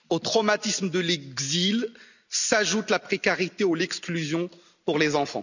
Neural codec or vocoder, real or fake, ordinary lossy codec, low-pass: none; real; none; 7.2 kHz